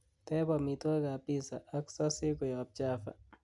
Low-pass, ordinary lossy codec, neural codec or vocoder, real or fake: 10.8 kHz; none; none; real